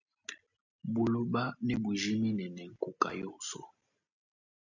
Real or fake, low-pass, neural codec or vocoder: real; 7.2 kHz; none